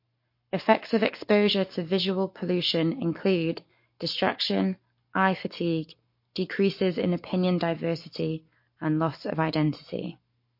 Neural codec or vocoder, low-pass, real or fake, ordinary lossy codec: codec, 44.1 kHz, 7.8 kbps, Pupu-Codec; 5.4 kHz; fake; MP3, 32 kbps